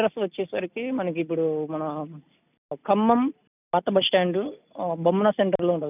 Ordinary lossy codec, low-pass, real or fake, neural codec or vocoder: none; 3.6 kHz; real; none